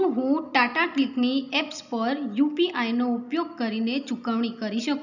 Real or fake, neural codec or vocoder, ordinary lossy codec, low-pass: real; none; none; 7.2 kHz